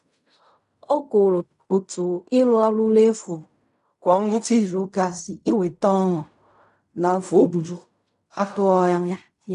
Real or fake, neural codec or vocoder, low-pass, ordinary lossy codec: fake; codec, 16 kHz in and 24 kHz out, 0.4 kbps, LongCat-Audio-Codec, fine tuned four codebook decoder; 10.8 kHz; none